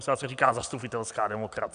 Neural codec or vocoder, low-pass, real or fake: none; 9.9 kHz; real